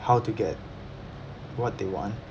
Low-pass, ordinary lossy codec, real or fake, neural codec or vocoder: none; none; real; none